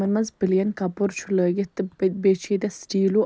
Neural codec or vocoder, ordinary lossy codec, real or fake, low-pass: none; none; real; none